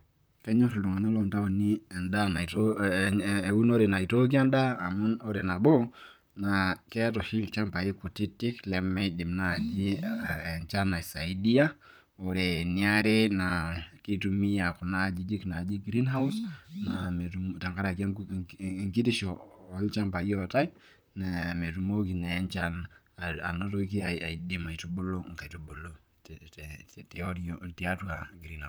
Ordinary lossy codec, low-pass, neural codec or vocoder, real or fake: none; none; vocoder, 44.1 kHz, 128 mel bands, Pupu-Vocoder; fake